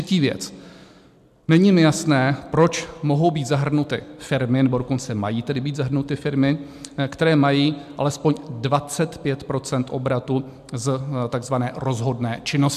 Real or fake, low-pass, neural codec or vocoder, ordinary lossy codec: real; 14.4 kHz; none; MP3, 96 kbps